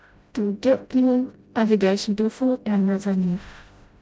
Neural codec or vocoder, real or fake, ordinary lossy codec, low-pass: codec, 16 kHz, 0.5 kbps, FreqCodec, smaller model; fake; none; none